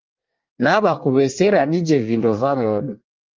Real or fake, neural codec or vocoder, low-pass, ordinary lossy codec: fake; codec, 24 kHz, 1 kbps, SNAC; 7.2 kHz; Opus, 32 kbps